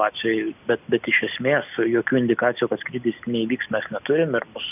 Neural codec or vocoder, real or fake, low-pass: none; real; 3.6 kHz